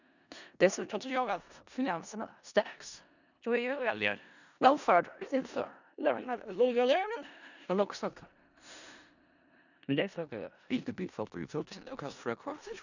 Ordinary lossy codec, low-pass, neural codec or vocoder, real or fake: none; 7.2 kHz; codec, 16 kHz in and 24 kHz out, 0.4 kbps, LongCat-Audio-Codec, four codebook decoder; fake